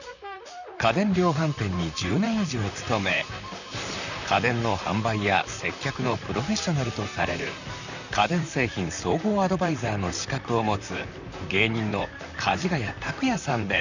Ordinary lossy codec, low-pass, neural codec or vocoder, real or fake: none; 7.2 kHz; vocoder, 44.1 kHz, 128 mel bands, Pupu-Vocoder; fake